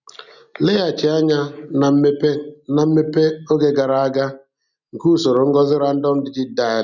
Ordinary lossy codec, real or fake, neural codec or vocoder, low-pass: none; real; none; 7.2 kHz